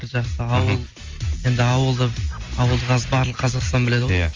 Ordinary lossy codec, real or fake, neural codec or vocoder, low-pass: Opus, 32 kbps; real; none; 7.2 kHz